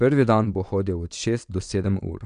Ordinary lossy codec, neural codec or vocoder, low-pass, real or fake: none; vocoder, 22.05 kHz, 80 mel bands, WaveNeXt; 9.9 kHz; fake